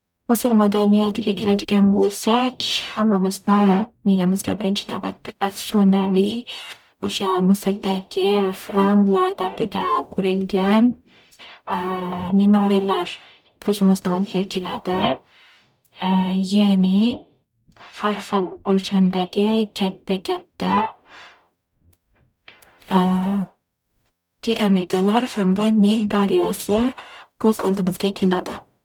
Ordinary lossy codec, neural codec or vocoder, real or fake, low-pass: none; codec, 44.1 kHz, 0.9 kbps, DAC; fake; 19.8 kHz